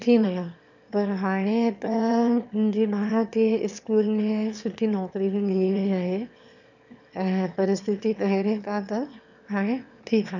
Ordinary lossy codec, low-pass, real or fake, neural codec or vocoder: none; 7.2 kHz; fake; autoencoder, 22.05 kHz, a latent of 192 numbers a frame, VITS, trained on one speaker